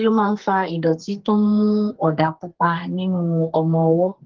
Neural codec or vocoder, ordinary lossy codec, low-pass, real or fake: codec, 32 kHz, 1.9 kbps, SNAC; Opus, 16 kbps; 7.2 kHz; fake